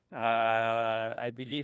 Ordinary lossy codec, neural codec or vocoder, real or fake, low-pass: none; codec, 16 kHz, 1 kbps, FunCodec, trained on LibriTTS, 50 frames a second; fake; none